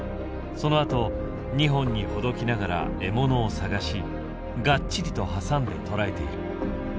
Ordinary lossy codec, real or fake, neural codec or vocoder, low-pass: none; real; none; none